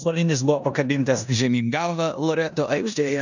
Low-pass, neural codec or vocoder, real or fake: 7.2 kHz; codec, 16 kHz in and 24 kHz out, 0.9 kbps, LongCat-Audio-Codec, four codebook decoder; fake